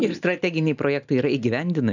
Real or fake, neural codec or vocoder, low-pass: real; none; 7.2 kHz